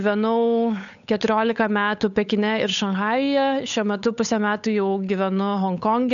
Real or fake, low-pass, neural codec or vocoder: fake; 7.2 kHz; codec, 16 kHz, 8 kbps, FunCodec, trained on Chinese and English, 25 frames a second